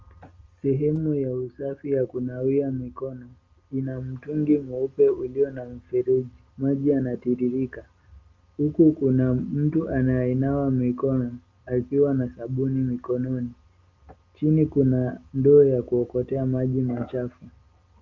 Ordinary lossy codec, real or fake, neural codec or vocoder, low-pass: Opus, 32 kbps; real; none; 7.2 kHz